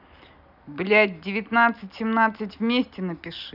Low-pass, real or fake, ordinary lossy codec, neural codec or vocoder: 5.4 kHz; real; none; none